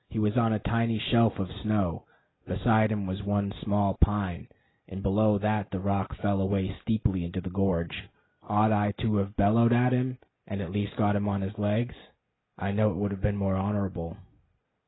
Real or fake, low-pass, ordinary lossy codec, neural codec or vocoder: real; 7.2 kHz; AAC, 16 kbps; none